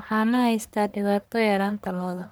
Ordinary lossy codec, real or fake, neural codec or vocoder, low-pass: none; fake; codec, 44.1 kHz, 1.7 kbps, Pupu-Codec; none